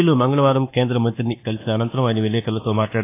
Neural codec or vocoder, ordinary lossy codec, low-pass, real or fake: codec, 24 kHz, 1.2 kbps, DualCodec; AAC, 24 kbps; 3.6 kHz; fake